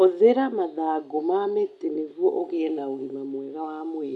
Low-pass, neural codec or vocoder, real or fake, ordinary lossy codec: none; none; real; none